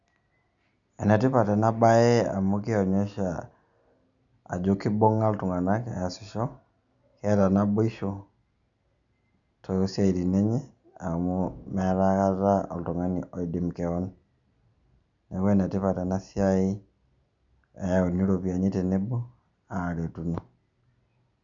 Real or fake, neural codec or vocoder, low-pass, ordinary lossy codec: real; none; 7.2 kHz; none